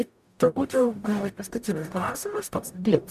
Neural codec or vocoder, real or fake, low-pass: codec, 44.1 kHz, 0.9 kbps, DAC; fake; 14.4 kHz